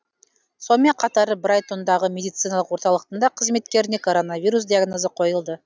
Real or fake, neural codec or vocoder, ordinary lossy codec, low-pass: real; none; none; none